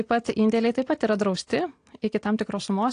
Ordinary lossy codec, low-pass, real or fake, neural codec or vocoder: AAC, 48 kbps; 9.9 kHz; real; none